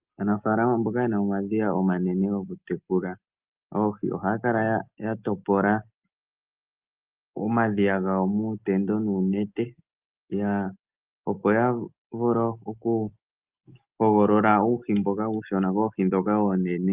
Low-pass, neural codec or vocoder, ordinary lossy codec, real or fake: 3.6 kHz; none; Opus, 32 kbps; real